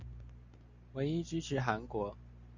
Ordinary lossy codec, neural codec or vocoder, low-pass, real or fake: Opus, 64 kbps; none; 7.2 kHz; real